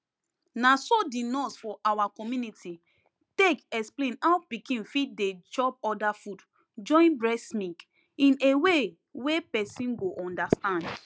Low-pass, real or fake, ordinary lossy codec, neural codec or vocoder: none; real; none; none